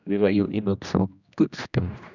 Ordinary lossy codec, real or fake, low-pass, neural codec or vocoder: none; fake; 7.2 kHz; codec, 16 kHz, 1 kbps, X-Codec, HuBERT features, trained on general audio